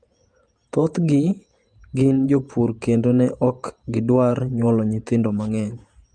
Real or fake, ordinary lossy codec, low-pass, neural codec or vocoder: fake; Opus, 32 kbps; 9.9 kHz; vocoder, 48 kHz, 128 mel bands, Vocos